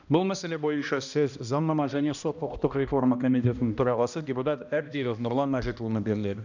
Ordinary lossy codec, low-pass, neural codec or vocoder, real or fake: none; 7.2 kHz; codec, 16 kHz, 1 kbps, X-Codec, HuBERT features, trained on balanced general audio; fake